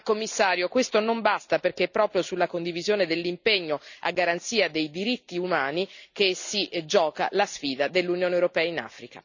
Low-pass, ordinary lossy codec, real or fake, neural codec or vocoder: 7.2 kHz; none; real; none